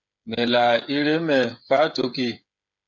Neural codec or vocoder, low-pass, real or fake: codec, 16 kHz, 8 kbps, FreqCodec, smaller model; 7.2 kHz; fake